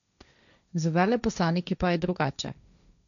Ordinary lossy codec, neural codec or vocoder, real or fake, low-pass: none; codec, 16 kHz, 1.1 kbps, Voila-Tokenizer; fake; 7.2 kHz